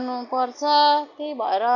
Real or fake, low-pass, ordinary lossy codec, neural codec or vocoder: real; 7.2 kHz; none; none